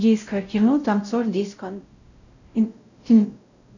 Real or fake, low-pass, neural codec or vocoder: fake; 7.2 kHz; codec, 16 kHz, 0.5 kbps, X-Codec, WavLM features, trained on Multilingual LibriSpeech